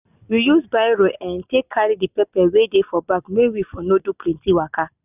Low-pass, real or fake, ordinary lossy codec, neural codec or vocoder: 3.6 kHz; real; none; none